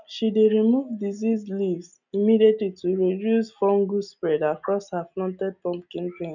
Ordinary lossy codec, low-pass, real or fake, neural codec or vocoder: none; 7.2 kHz; real; none